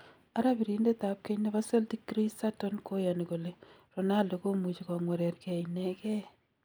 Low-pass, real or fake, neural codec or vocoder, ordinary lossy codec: none; real; none; none